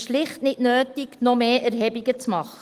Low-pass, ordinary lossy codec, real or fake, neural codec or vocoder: 14.4 kHz; Opus, 24 kbps; real; none